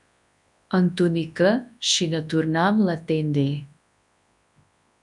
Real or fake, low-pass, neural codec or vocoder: fake; 10.8 kHz; codec, 24 kHz, 0.9 kbps, WavTokenizer, large speech release